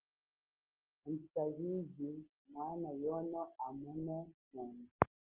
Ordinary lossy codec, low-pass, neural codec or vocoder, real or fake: Opus, 16 kbps; 3.6 kHz; none; real